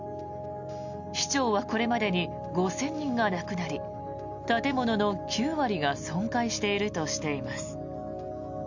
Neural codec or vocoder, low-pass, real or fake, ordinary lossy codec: none; 7.2 kHz; real; none